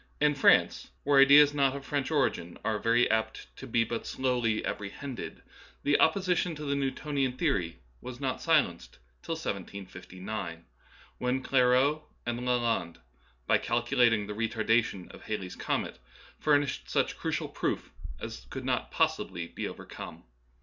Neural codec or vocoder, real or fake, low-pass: none; real; 7.2 kHz